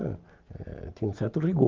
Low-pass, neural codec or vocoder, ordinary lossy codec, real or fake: 7.2 kHz; none; Opus, 32 kbps; real